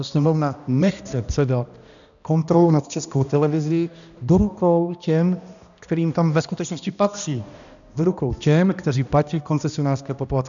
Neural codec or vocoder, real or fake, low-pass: codec, 16 kHz, 1 kbps, X-Codec, HuBERT features, trained on balanced general audio; fake; 7.2 kHz